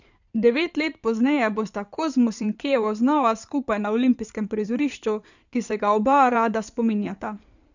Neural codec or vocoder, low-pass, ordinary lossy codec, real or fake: codec, 16 kHz in and 24 kHz out, 2.2 kbps, FireRedTTS-2 codec; 7.2 kHz; none; fake